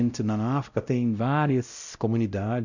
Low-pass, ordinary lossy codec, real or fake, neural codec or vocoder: 7.2 kHz; none; fake; codec, 16 kHz, 0.5 kbps, X-Codec, WavLM features, trained on Multilingual LibriSpeech